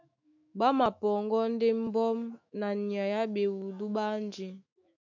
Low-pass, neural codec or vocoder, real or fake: 7.2 kHz; autoencoder, 48 kHz, 128 numbers a frame, DAC-VAE, trained on Japanese speech; fake